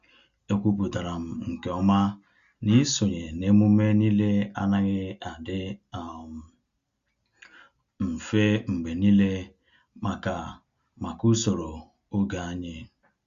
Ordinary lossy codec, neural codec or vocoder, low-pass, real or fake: Opus, 64 kbps; none; 7.2 kHz; real